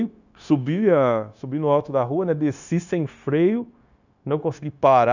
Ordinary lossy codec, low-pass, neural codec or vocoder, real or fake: none; 7.2 kHz; codec, 16 kHz, 0.9 kbps, LongCat-Audio-Codec; fake